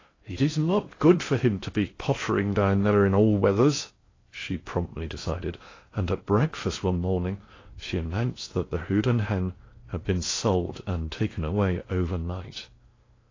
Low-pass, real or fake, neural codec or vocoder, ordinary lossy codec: 7.2 kHz; fake; codec, 16 kHz in and 24 kHz out, 0.6 kbps, FocalCodec, streaming, 4096 codes; AAC, 32 kbps